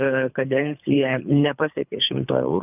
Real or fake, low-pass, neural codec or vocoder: fake; 3.6 kHz; codec, 24 kHz, 3 kbps, HILCodec